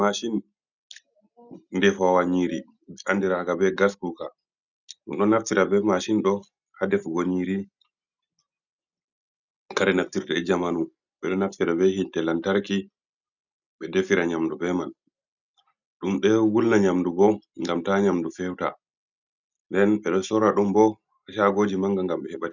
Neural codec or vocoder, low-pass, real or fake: none; 7.2 kHz; real